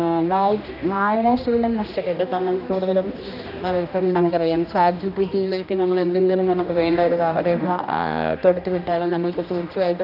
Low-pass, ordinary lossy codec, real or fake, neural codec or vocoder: 5.4 kHz; none; fake; codec, 16 kHz, 1 kbps, X-Codec, HuBERT features, trained on general audio